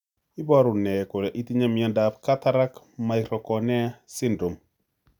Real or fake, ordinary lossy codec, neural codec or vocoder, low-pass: real; none; none; 19.8 kHz